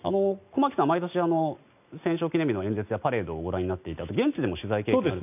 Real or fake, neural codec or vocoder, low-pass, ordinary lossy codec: real; none; 3.6 kHz; none